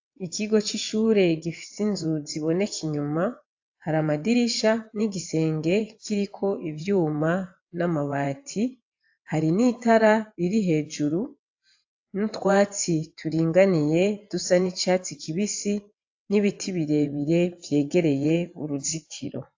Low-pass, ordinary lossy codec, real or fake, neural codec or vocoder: 7.2 kHz; AAC, 48 kbps; fake; vocoder, 22.05 kHz, 80 mel bands, WaveNeXt